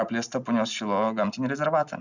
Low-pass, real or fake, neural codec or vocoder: 7.2 kHz; real; none